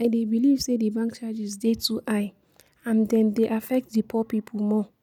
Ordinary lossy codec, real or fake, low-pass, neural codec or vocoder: none; real; 19.8 kHz; none